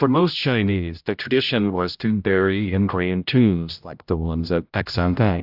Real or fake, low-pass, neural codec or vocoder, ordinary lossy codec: fake; 5.4 kHz; codec, 16 kHz, 0.5 kbps, X-Codec, HuBERT features, trained on general audio; AAC, 48 kbps